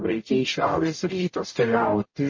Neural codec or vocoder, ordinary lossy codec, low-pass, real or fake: codec, 44.1 kHz, 0.9 kbps, DAC; MP3, 32 kbps; 7.2 kHz; fake